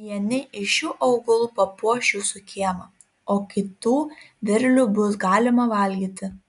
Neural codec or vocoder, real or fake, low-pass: none; real; 10.8 kHz